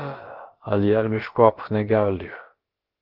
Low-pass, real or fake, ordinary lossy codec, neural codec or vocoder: 5.4 kHz; fake; Opus, 16 kbps; codec, 16 kHz, about 1 kbps, DyCAST, with the encoder's durations